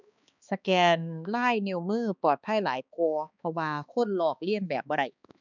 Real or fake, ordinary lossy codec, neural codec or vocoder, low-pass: fake; none; codec, 16 kHz, 2 kbps, X-Codec, HuBERT features, trained on balanced general audio; 7.2 kHz